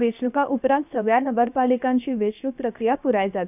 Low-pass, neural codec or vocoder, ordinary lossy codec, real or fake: 3.6 kHz; codec, 16 kHz, 0.7 kbps, FocalCodec; none; fake